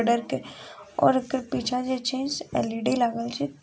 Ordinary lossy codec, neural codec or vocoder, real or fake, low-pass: none; none; real; none